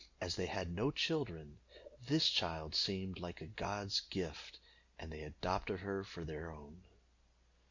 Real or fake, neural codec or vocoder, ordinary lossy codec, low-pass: real; none; Opus, 64 kbps; 7.2 kHz